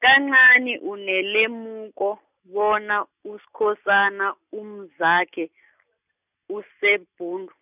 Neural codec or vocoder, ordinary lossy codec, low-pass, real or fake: none; none; 3.6 kHz; real